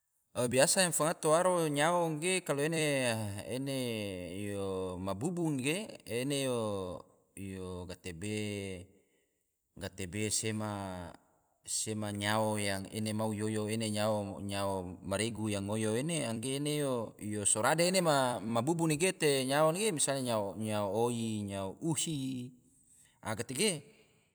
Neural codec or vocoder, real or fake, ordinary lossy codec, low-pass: vocoder, 44.1 kHz, 128 mel bands every 256 samples, BigVGAN v2; fake; none; none